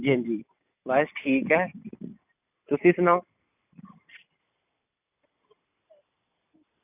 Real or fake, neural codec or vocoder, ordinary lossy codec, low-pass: real; none; none; 3.6 kHz